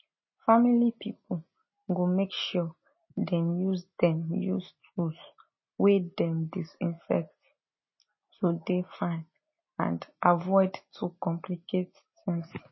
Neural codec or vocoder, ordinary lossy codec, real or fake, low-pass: none; MP3, 24 kbps; real; 7.2 kHz